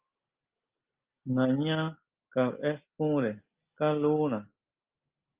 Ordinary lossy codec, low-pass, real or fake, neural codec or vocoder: Opus, 24 kbps; 3.6 kHz; real; none